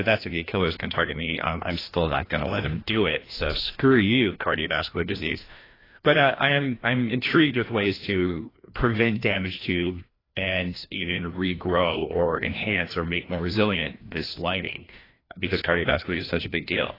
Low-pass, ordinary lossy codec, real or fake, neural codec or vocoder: 5.4 kHz; AAC, 24 kbps; fake; codec, 16 kHz, 1 kbps, FreqCodec, larger model